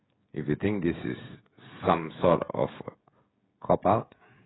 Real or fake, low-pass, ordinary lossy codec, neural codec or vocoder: fake; 7.2 kHz; AAC, 16 kbps; codec, 16 kHz, 16 kbps, FreqCodec, smaller model